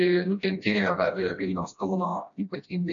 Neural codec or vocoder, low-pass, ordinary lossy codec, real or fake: codec, 16 kHz, 1 kbps, FreqCodec, smaller model; 7.2 kHz; MP3, 96 kbps; fake